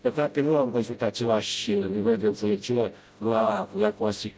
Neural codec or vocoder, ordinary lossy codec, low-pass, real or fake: codec, 16 kHz, 0.5 kbps, FreqCodec, smaller model; none; none; fake